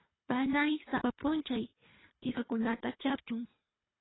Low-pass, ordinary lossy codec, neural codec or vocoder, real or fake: 7.2 kHz; AAC, 16 kbps; codec, 24 kHz, 3 kbps, HILCodec; fake